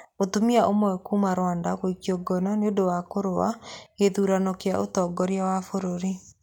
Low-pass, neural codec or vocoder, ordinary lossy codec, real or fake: 19.8 kHz; none; none; real